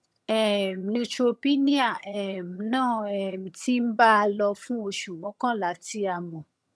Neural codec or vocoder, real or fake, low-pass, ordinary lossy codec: vocoder, 22.05 kHz, 80 mel bands, HiFi-GAN; fake; none; none